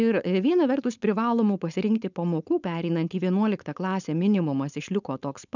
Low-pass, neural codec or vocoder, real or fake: 7.2 kHz; codec, 16 kHz, 4.8 kbps, FACodec; fake